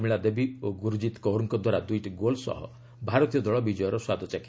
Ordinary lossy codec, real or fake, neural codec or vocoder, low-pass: none; real; none; none